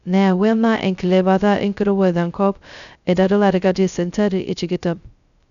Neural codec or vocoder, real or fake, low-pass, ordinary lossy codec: codec, 16 kHz, 0.2 kbps, FocalCodec; fake; 7.2 kHz; none